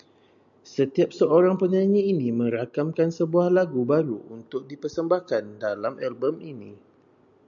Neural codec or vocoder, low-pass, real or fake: none; 7.2 kHz; real